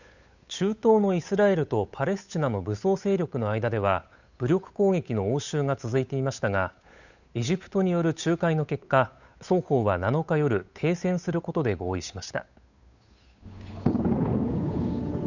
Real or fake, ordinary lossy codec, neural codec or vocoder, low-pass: fake; none; codec, 16 kHz, 8 kbps, FunCodec, trained on Chinese and English, 25 frames a second; 7.2 kHz